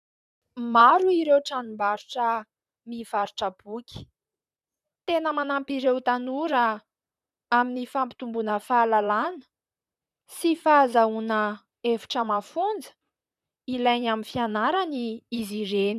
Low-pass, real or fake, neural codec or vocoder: 14.4 kHz; fake; vocoder, 44.1 kHz, 128 mel bands, Pupu-Vocoder